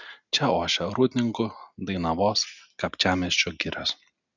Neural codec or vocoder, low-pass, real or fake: none; 7.2 kHz; real